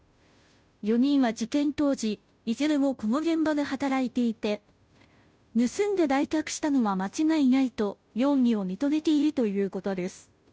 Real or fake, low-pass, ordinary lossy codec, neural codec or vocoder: fake; none; none; codec, 16 kHz, 0.5 kbps, FunCodec, trained on Chinese and English, 25 frames a second